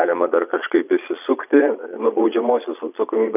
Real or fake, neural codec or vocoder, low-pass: fake; vocoder, 22.05 kHz, 80 mel bands, Vocos; 3.6 kHz